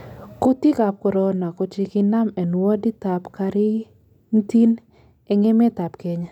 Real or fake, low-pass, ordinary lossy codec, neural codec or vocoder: real; 19.8 kHz; none; none